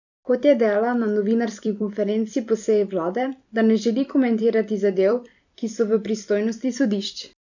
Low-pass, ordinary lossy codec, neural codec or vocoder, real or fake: 7.2 kHz; none; vocoder, 44.1 kHz, 128 mel bands every 256 samples, BigVGAN v2; fake